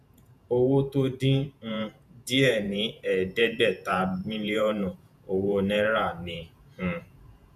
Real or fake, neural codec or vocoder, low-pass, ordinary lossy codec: fake; vocoder, 48 kHz, 128 mel bands, Vocos; 14.4 kHz; none